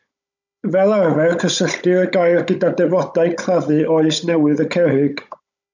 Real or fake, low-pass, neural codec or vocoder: fake; 7.2 kHz; codec, 16 kHz, 16 kbps, FunCodec, trained on Chinese and English, 50 frames a second